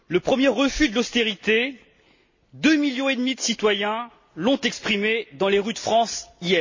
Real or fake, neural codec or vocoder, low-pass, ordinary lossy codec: real; none; 7.2 kHz; MP3, 32 kbps